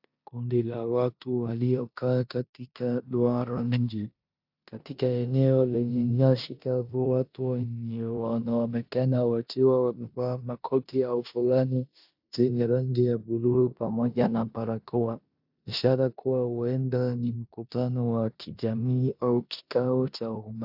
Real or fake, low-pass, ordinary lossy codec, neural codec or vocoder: fake; 5.4 kHz; AAC, 48 kbps; codec, 16 kHz in and 24 kHz out, 0.9 kbps, LongCat-Audio-Codec, four codebook decoder